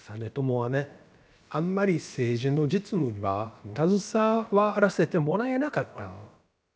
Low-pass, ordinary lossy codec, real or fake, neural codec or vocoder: none; none; fake; codec, 16 kHz, about 1 kbps, DyCAST, with the encoder's durations